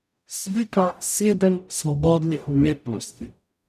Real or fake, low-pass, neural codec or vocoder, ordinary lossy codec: fake; 14.4 kHz; codec, 44.1 kHz, 0.9 kbps, DAC; none